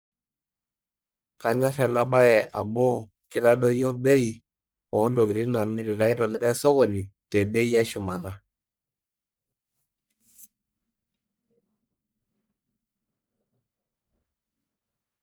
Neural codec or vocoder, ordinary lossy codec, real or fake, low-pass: codec, 44.1 kHz, 1.7 kbps, Pupu-Codec; none; fake; none